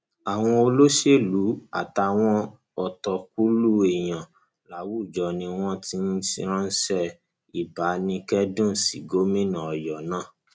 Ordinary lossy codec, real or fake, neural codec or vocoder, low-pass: none; real; none; none